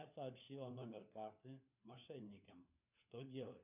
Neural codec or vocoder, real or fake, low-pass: codec, 16 kHz, 4 kbps, FunCodec, trained on LibriTTS, 50 frames a second; fake; 3.6 kHz